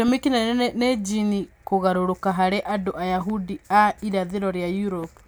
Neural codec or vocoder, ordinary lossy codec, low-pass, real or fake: none; none; none; real